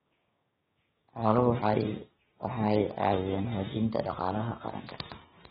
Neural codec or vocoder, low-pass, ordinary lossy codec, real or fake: codec, 16 kHz, 6 kbps, DAC; 7.2 kHz; AAC, 16 kbps; fake